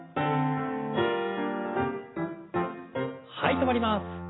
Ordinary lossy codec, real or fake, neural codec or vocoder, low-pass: AAC, 16 kbps; real; none; 7.2 kHz